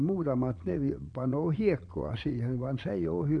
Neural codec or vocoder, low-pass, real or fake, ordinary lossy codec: vocoder, 22.05 kHz, 80 mel bands, Vocos; 9.9 kHz; fake; none